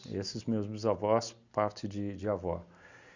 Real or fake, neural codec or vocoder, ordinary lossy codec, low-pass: real; none; Opus, 64 kbps; 7.2 kHz